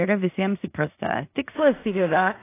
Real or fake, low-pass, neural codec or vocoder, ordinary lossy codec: fake; 3.6 kHz; codec, 16 kHz in and 24 kHz out, 0.4 kbps, LongCat-Audio-Codec, two codebook decoder; AAC, 24 kbps